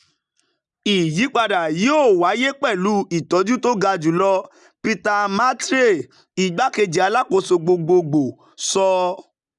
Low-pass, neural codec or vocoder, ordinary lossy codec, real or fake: 10.8 kHz; none; none; real